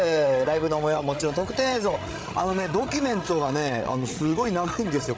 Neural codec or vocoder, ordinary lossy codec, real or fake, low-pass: codec, 16 kHz, 8 kbps, FreqCodec, larger model; none; fake; none